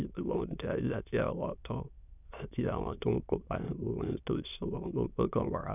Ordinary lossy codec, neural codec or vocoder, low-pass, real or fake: none; autoencoder, 22.05 kHz, a latent of 192 numbers a frame, VITS, trained on many speakers; 3.6 kHz; fake